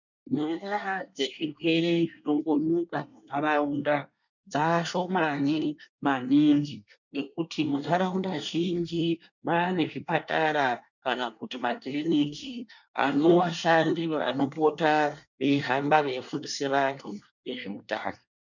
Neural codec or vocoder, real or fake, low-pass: codec, 24 kHz, 1 kbps, SNAC; fake; 7.2 kHz